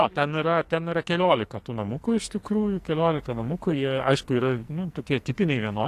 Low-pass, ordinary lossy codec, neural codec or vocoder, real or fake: 14.4 kHz; AAC, 64 kbps; codec, 44.1 kHz, 2.6 kbps, SNAC; fake